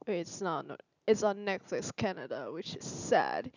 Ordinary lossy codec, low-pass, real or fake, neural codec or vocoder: none; 7.2 kHz; real; none